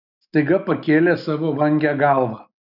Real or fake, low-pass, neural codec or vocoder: real; 5.4 kHz; none